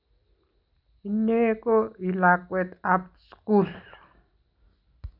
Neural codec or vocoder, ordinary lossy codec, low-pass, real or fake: none; none; 5.4 kHz; real